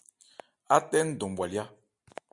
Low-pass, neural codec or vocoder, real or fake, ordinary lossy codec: 10.8 kHz; none; real; MP3, 96 kbps